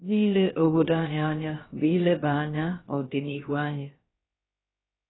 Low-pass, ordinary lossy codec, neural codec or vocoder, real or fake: 7.2 kHz; AAC, 16 kbps; codec, 16 kHz, about 1 kbps, DyCAST, with the encoder's durations; fake